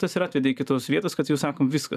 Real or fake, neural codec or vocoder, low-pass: fake; vocoder, 44.1 kHz, 128 mel bands every 512 samples, BigVGAN v2; 14.4 kHz